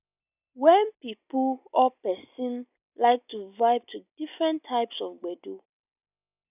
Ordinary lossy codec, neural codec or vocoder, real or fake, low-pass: none; none; real; 3.6 kHz